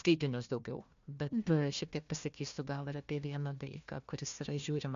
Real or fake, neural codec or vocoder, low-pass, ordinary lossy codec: fake; codec, 16 kHz, 1 kbps, FunCodec, trained on Chinese and English, 50 frames a second; 7.2 kHz; MP3, 64 kbps